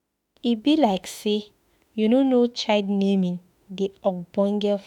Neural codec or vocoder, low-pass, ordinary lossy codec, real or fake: autoencoder, 48 kHz, 32 numbers a frame, DAC-VAE, trained on Japanese speech; 19.8 kHz; none; fake